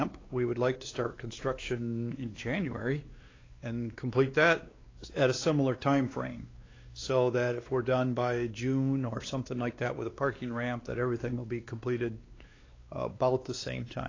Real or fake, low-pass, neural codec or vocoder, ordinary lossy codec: fake; 7.2 kHz; codec, 16 kHz, 2 kbps, X-Codec, WavLM features, trained on Multilingual LibriSpeech; AAC, 32 kbps